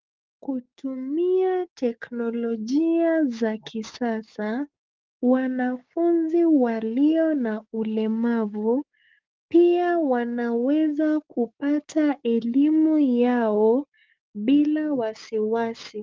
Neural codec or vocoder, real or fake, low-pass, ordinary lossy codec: codec, 16 kHz, 6 kbps, DAC; fake; 7.2 kHz; Opus, 24 kbps